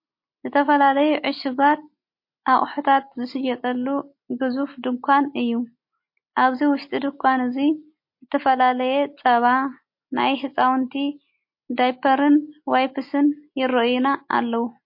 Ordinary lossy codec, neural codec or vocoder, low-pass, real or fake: MP3, 32 kbps; none; 5.4 kHz; real